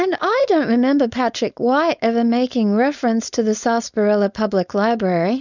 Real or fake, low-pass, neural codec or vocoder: fake; 7.2 kHz; codec, 16 kHz, 4.8 kbps, FACodec